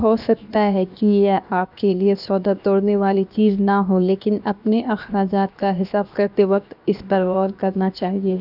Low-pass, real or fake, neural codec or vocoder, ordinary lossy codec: 5.4 kHz; fake; codec, 16 kHz, 0.7 kbps, FocalCodec; none